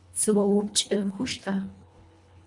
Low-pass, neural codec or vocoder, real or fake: 10.8 kHz; codec, 24 kHz, 1.5 kbps, HILCodec; fake